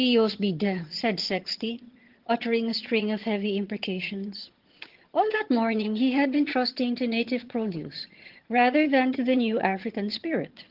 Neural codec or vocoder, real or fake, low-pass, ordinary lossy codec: vocoder, 22.05 kHz, 80 mel bands, HiFi-GAN; fake; 5.4 kHz; Opus, 16 kbps